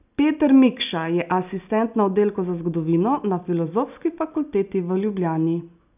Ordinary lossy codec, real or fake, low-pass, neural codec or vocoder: none; real; 3.6 kHz; none